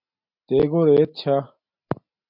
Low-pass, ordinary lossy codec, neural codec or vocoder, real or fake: 5.4 kHz; MP3, 48 kbps; none; real